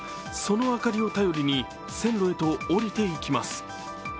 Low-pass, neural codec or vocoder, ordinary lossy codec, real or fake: none; none; none; real